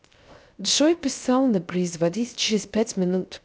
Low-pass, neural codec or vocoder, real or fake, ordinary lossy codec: none; codec, 16 kHz, 0.3 kbps, FocalCodec; fake; none